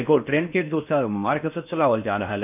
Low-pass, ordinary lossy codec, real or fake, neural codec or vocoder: 3.6 kHz; none; fake; codec, 16 kHz in and 24 kHz out, 0.6 kbps, FocalCodec, streaming, 4096 codes